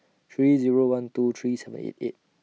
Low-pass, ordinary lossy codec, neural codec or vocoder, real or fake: none; none; none; real